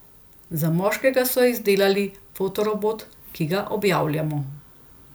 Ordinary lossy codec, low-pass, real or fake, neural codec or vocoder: none; none; real; none